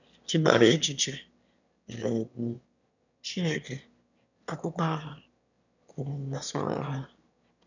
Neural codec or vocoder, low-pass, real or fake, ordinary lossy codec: autoencoder, 22.05 kHz, a latent of 192 numbers a frame, VITS, trained on one speaker; 7.2 kHz; fake; none